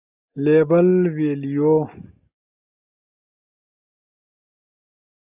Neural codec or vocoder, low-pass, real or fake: none; 3.6 kHz; real